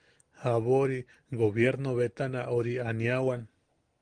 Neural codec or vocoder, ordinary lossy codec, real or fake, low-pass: none; Opus, 24 kbps; real; 9.9 kHz